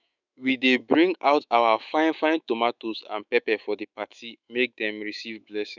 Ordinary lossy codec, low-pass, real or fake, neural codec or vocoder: none; 7.2 kHz; fake; autoencoder, 48 kHz, 128 numbers a frame, DAC-VAE, trained on Japanese speech